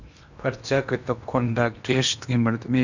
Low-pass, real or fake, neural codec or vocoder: 7.2 kHz; fake; codec, 16 kHz in and 24 kHz out, 0.8 kbps, FocalCodec, streaming, 65536 codes